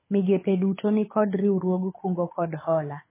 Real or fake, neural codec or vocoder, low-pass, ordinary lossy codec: fake; codec, 24 kHz, 6 kbps, HILCodec; 3.6 kHz; MP3, 16 kbps